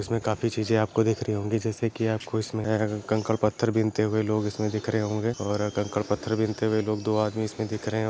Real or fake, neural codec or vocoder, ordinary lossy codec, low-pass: real; none; none; none